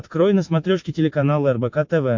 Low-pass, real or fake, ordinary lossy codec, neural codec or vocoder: 7.2 kHz; real; MP3, 48 kbps; none